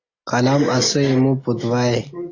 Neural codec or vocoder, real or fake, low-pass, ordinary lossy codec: none; real; 7.2 kHz; AAC, 32 kbps